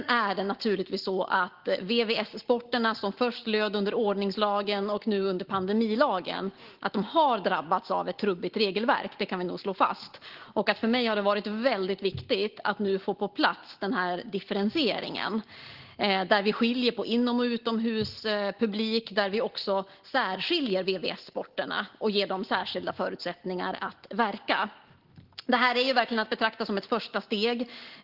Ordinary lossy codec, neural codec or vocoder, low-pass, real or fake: Opus, 16 kbps; none; 5.4 kHz; real